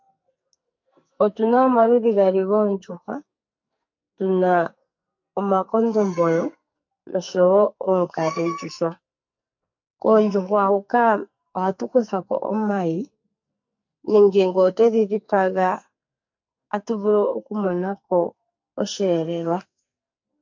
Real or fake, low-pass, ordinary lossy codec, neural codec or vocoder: fake; 7.2 kHz; MP3, 48 kbps; codec, 44.1 kHz, 2.6 kbps, SNAC